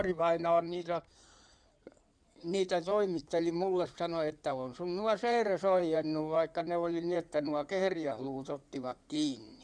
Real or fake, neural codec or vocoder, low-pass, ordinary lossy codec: fake; codec, 16 kHz in and 24 kHz out, 2.2 kbps, FireRedTTS-2 codec; 9.9 kHz; none